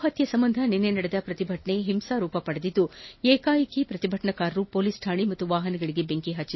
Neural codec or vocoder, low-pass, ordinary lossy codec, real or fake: none; 7.2 kHz; MP3, 24 kbps; real